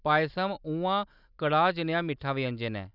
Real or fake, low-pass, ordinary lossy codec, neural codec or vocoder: real; 5.4 kHz; none; none